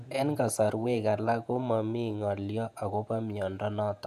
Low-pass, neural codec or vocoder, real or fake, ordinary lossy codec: 14.4 kHz; vocoder, 48 kHz, 128 mel bands, Vocos; fake; none